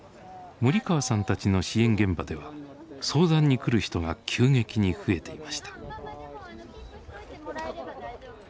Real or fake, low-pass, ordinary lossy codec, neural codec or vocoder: real; none; none; none